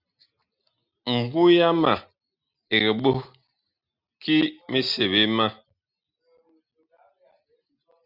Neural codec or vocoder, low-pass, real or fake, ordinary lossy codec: none; 5.4 kHz; real; Opus, 64 kbps